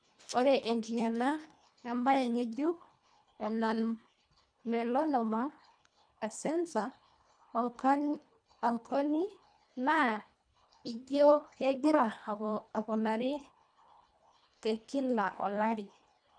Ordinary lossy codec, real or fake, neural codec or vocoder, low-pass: none; fake; codec, 24 kHz, 1.5 kbps, HILCodec; 9.9 kHz